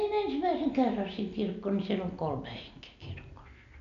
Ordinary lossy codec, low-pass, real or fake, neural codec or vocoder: none; 7.2 kHz; real; none